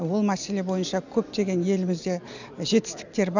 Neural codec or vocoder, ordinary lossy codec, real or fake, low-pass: none; none; real; 7.2 kHz